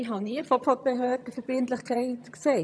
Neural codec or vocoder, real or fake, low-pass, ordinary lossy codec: vocoder, 22.05 kHz, 80 mel bands, HiFi-GAN; fake; none; none